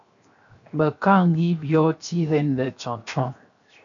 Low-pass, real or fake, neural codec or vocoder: 7.2 kHz; fake; codec, 16 kHz, 0.7 kbps, FocalCodec